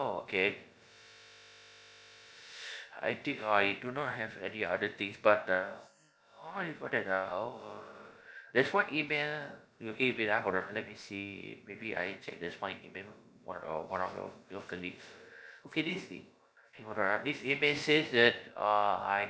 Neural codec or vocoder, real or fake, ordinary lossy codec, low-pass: codec, 16 kHz, about 1 kbps, DyCAST, with the encoder's durations; fake; none; none